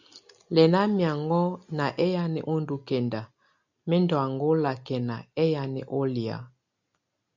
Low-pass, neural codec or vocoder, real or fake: 7.2 kHz; none; real